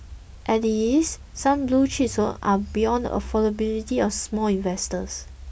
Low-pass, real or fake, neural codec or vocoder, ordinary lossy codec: none; real; none; none